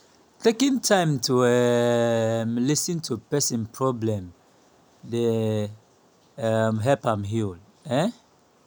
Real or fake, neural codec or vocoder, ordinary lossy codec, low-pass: real; none; none; none